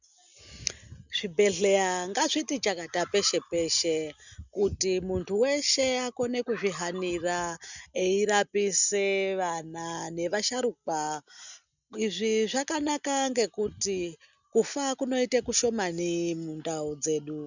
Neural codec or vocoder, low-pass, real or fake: none; 7.2 kHz; real